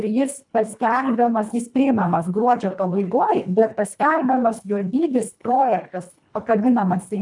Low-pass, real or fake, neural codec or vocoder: 10.8 kHz; fake; codec, 24 kHz, 1.5 kbps, HILCodec